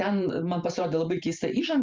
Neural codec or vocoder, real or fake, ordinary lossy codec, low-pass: vocoder, 44.1 kHz, 128 mel bands every 512 samples, BigVGAN v2; fake; Opus, 24 kbps; 7.2 kHz